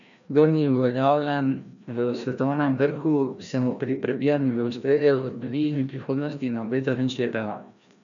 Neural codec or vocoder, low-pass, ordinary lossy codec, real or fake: codec, 16 kHz, 1 kbps, FreqCodec, larger model; 7.2 kHz; none; fake